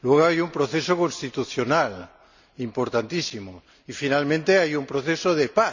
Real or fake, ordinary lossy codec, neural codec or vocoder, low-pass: real; none; none; 7.2 kHz